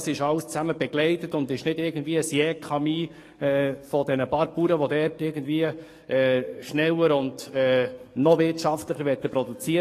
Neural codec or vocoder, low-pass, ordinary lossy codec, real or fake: codec, 44.1 kHz, 7.8 kbps, DAC; 14.4 kHz; AAC, 48 kbps; fake